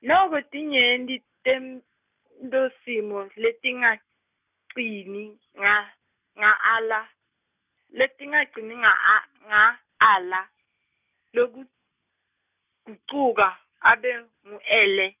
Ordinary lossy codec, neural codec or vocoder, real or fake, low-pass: none; none; real; 3.6 kHz